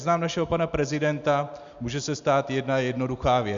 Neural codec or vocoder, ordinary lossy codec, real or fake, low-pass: none; Opus, 64 kbps; real; 7.2 kHz